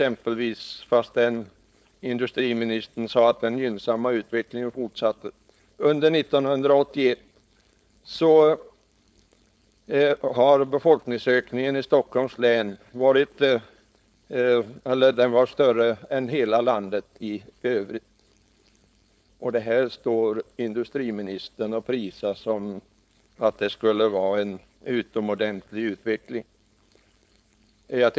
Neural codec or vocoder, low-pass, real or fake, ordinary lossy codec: codec, 16 kHz, 4.8 kbps, FACodec; none; fake; none